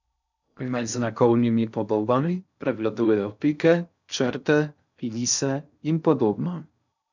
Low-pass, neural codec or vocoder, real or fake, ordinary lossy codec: 7.2 kHz; codec, 16 kHz in and 24 kHz out, 0.6 kbps, FocalCodec, streaming, 4096 codes; fake; none